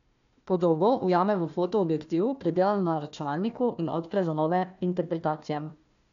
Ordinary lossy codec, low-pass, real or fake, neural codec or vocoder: none; 7.2 kHz; fake; codec, 16 kHz, 1 kbps, FunCodec, trained on Chinese and English, 50 frames a second